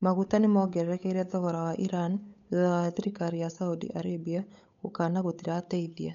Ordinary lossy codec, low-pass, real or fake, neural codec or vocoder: none; 7.2 kHz; fake; codec, 16 kHz, 8 kbps, FunCodec, trained on Chinese and English, 25 frames a second